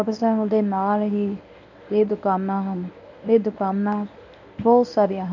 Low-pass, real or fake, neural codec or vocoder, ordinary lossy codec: 7.2 kHz; fake; codec, 24 kHz, 0.9 kbps, WavTokenizer, medium speech release version 1; none